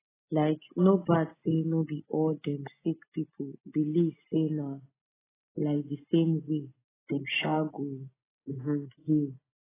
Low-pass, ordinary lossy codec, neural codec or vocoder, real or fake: 3.6 kHz; AAC, 16 kbps; none; real